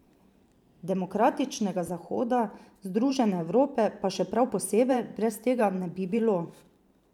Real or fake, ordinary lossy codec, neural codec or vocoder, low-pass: fake; none; vocoder, 44.1 kHz, 128 mel bands every 512 samples, BigVGAN v2; 19.8 kHz